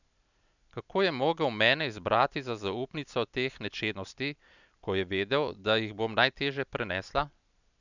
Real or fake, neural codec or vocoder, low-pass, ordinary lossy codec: real; none; 7.2 kHz; none